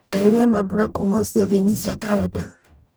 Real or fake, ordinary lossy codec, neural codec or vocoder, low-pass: fake; none; codec, 44.1 kHz, 0.9 kbps, DAC; none